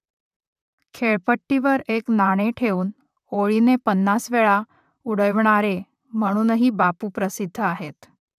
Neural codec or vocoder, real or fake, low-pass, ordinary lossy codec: vocoder, 44.1 kHz, 128 mel bands, Pupu-Vocoder; fake; 14.4 kHz; none